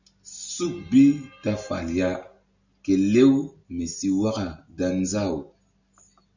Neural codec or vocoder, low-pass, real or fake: none; 7.2 kHz; real